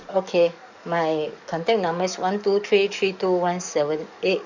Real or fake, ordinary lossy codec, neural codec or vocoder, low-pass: fake; none; codec, 44.1 kHz, 7.8 kbps, DAC; 7.2 kHz